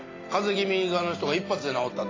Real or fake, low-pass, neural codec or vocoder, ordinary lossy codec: real; 7.2 kHz; none; AAC, 32 kbps